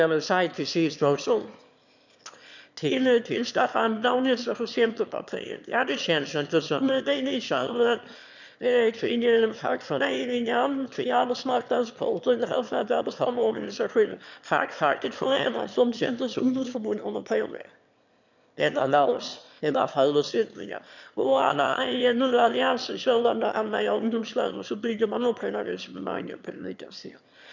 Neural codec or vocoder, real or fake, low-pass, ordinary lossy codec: autoencoder, 22.05 kHz, a latent of 192 numbers a frame, VITS, trained on one speaker; fake; 7.2 kHz; none